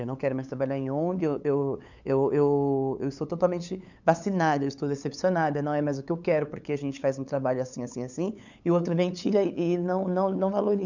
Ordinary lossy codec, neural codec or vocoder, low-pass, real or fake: none; codec, 16 kHz, 8 kbps, FunCodec, trained on LibriTTS, 25 frames a second; 7.2 kHz; fake